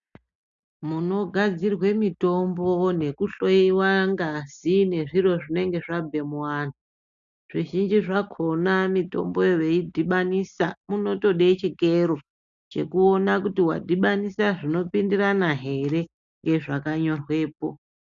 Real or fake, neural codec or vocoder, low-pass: real; none; 7.2 kHz